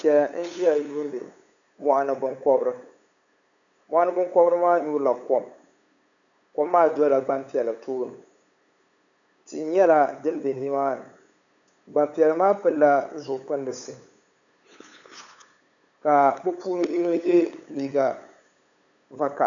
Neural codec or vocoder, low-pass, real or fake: codec, 16 kHz, 8 kbps, FunCodec, trained on LibriTTS, 25 frames a second; 7.2 kHz; fake